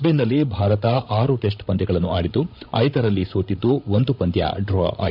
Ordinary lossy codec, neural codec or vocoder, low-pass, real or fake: none; codec, 16 kHz, 16 kbps, FreqCodec, larger model; 5.4 kHz; fake